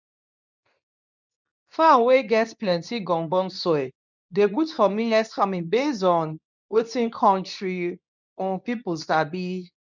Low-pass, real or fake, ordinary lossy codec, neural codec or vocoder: 7.2 kHz; fake; none; codec, 24 kHz, 0.9 kbps, WavTokenizer, medium speech release version 2